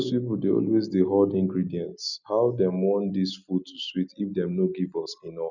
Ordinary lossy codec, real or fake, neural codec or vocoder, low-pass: none; real; none; 7.2 kHz